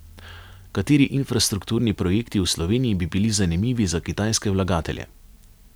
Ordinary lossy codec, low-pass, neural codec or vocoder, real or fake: none; none; none; real